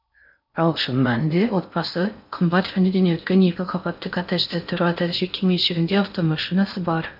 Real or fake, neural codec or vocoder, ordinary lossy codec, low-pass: fake; codec, 16 kHz in and 24 kHz out, 0.6 kbps, FocalCodec, streaming, 4096 codes; none; 5.4 kHz